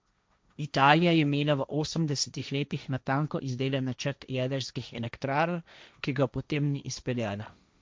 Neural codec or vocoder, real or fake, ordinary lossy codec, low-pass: codec, 16 kHz, 1.1 kbps, Voila-Tokenizer; fake; none; none